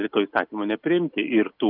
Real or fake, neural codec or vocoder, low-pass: real; none; 5.4 kHz